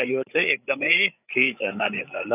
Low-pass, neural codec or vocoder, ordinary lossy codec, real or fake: 3.6 kHz; codec, 16 kHz, 8 kbps, FreqCodec, larger model; none; fake